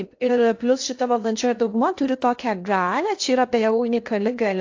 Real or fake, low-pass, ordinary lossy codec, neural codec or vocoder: fake; 7.2 kHz; AAC, 48 kbps; codec, 16 kHz in and 24 kHz out, 0.8 kbps, FocalCodec, streaming, 65536 codes